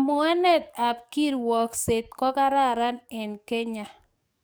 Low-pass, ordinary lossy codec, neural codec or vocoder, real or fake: none; none; codec, 44.1 kHz, 7.8 kbps, DAC; fake